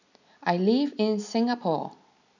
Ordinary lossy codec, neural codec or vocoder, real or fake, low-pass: none; none; real; 7.2 kHz